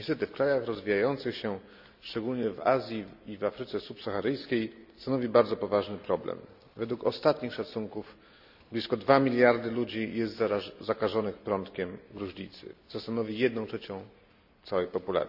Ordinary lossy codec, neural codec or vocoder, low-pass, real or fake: none; none; 5.4 kHz; real